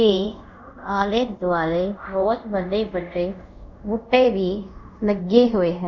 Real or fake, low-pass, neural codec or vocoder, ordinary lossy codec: fake; 7.2 kHz; codec, 24 kHz, 0.5 kbps, DualCodec; none